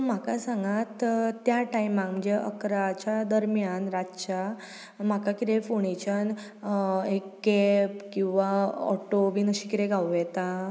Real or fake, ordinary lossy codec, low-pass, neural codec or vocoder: real; none; none; none